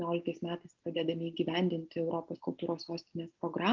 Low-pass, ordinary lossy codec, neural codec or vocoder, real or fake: 7.2 kHz; Opus, 24 kbps; none; real